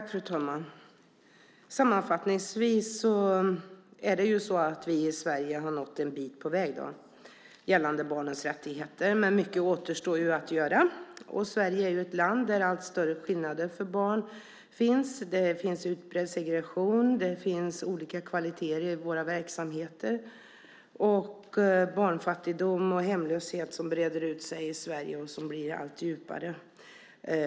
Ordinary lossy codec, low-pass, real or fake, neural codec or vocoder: none; none; real; none